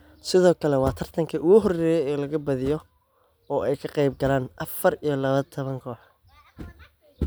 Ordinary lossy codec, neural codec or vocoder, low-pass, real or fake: none; none; none; real